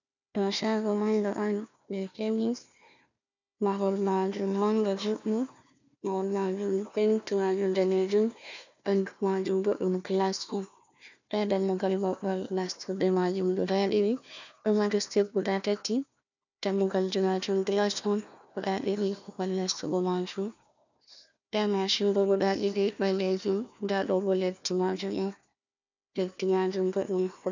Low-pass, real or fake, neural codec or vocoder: 7.2 kHz; fake; codec, 16 kHz, 1 kbps, FunCodec, trained on Chinese and English, 50 frames a second